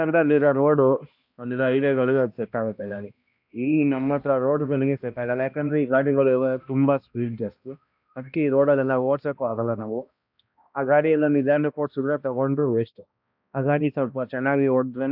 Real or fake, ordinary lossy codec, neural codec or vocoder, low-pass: fake; AAC, 48 kbps; codec, 16 kHz, 1 kbps, X-Codec, HuBERT features, trained on balanced general audio; 5.4 kHz